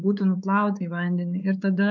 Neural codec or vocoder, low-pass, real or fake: codec, 16 kHz, 4 kbps, X-Codec, WavLM features, trained on Multilingual LibriSpeech; 7.2 kHz; fake